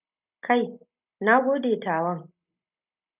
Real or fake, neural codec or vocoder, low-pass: real; none; 3.6 kHz